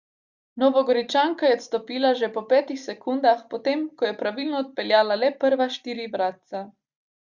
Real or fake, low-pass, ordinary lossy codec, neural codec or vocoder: real; 7.2 kHz; Opus, 64 kbps; none